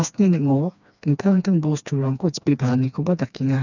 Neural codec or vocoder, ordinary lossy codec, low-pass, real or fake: codec, 16 kHz, 2 kbps, FreqCodec, smaller model; none; 7.2 kHz; fake